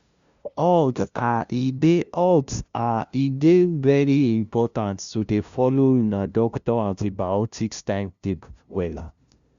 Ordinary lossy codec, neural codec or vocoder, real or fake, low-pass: Opus, 64 kbps; codec, 16 kHz, 0.5 kbps, FunCodec, trained on LibriTTS, 25 frames a second; fake; 7.2 kHz